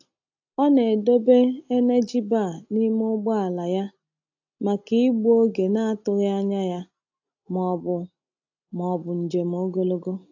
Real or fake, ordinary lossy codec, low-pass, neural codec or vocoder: real; AAC, 48 kbps; 7.2 kHz; none